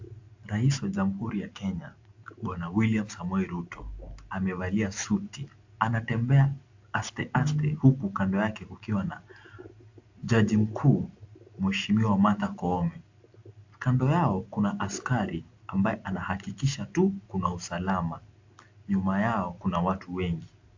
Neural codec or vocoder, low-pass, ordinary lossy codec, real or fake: none; 7.2 kHz; AAC, 48 kbps; real